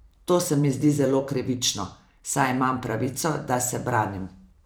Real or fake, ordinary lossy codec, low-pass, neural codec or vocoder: fake; none; none; vocoder, 44.1 kHz, 128 mel bands every 512 samples, BigVGAN v2